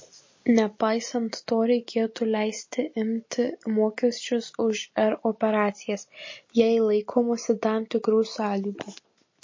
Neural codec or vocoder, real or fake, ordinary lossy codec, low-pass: none; real; MP3, 32 kbps; 7.2 kHz